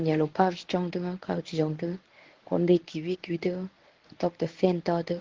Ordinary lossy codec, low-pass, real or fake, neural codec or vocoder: Opus, 16 kbps; 7.2 kHz; fake; codec, 24 kHz, 0.9 kbps, WavTokenizer, medium speech release version 1